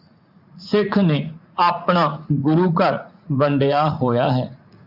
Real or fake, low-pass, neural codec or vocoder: fake; 5.4 kHz; vocoder, 44.1 kHz, 80 mel bands, Vocos